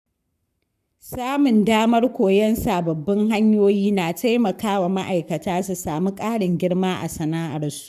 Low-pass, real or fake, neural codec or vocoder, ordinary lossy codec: 14.4 kHz; fake; codec, 44.1 kHz, 7.8 kbps, Pupu-Codec; none